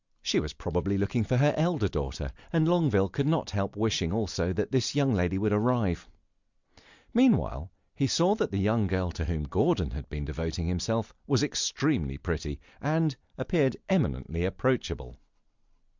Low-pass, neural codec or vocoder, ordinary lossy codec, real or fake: 7.2 kHz; none; Opus, 64 kbps; real